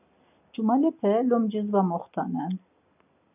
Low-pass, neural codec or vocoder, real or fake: 3.6 kHz; none; real